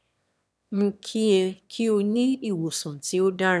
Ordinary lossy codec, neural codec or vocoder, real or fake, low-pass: none; autoencoder, 22.05 kHz, a latent of 192 numbers a frame, VITS, trained on one speaker; fake; none